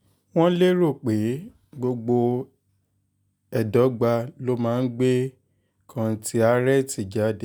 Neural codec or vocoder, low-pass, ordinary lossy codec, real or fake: none; none; none; real